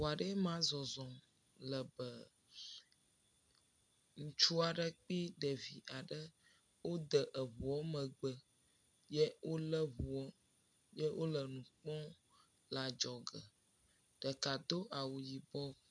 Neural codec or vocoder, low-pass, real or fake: none; 9.9 kHz; real